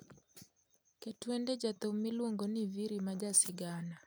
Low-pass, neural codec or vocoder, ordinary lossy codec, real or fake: none; none; none; real